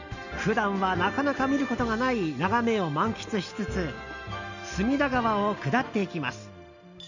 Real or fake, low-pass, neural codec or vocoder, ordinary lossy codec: real; 7.2 kHz; none; none